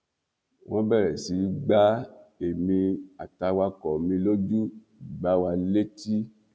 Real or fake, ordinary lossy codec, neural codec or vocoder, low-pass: real; none; none; none